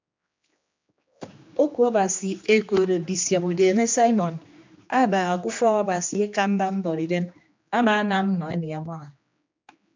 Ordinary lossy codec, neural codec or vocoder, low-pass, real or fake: MP3, 64 kbps; codec, 16 kHz, 2 kbps, X-Codec, HuBERT features, trained on general audio; 7.2 kHz; fake